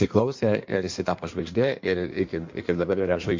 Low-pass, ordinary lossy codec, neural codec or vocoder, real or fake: 7.2 kHz; MP3, 48 kbps; codec, 16 kHz in and 24 kHz out, 1.1 kbps, FireRedTTS-2 codec; fake